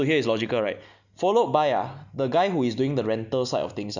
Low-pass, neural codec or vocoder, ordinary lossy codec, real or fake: 7.2 kHz; none; none; real